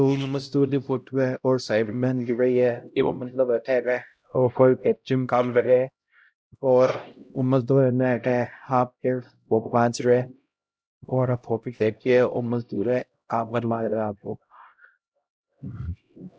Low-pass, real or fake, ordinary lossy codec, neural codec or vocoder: none; fake; none; codec, 16 kHz, 0.5 kbps, X-Codec, HuBERT features, trained on LibriSpeech